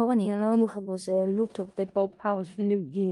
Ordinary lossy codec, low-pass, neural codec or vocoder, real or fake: Opus, 32 kbps; 10.8 kHz; codec, 16 kHz in and 24 kHz out, 0.4 kbps, LongCat-Audio-Codec, four codebook decoder; fake